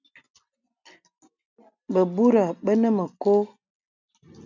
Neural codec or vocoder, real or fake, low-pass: none; real; 7.2 kHz